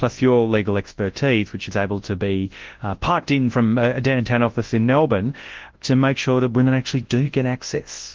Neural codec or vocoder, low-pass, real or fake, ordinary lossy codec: codec, 24 kHz, 0.9 kbps, WavTokenizer, large speech release; 7.2 kHz; fake; Opus, 16 kbps